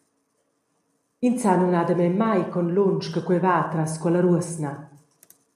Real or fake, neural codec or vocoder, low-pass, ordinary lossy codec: real; none; 14.4 kHz; AAC, 96 kbps